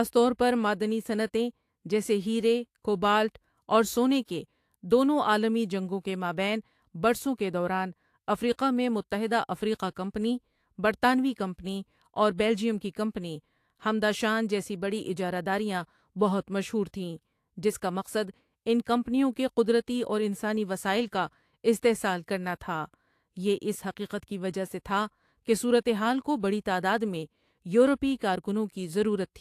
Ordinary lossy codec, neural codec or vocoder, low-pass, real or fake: AAC, 64 kbps; autoencoder, 48 kHz, 128 numbers a frame, DAC-VAE, trained on Japanese speech; 14.4 kHz; fake